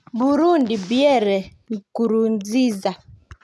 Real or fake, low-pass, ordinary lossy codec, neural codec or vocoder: real; none; none; none